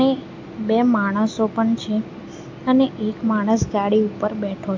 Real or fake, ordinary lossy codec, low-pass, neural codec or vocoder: real; AAC, 48 kbps; 7.2 kHz; none